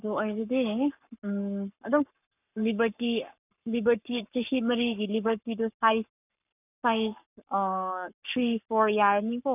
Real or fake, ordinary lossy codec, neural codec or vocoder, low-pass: fake; none; codec, 44.1 kHz, 7.8 kbps, Pupu-Codec; 3.6 kHz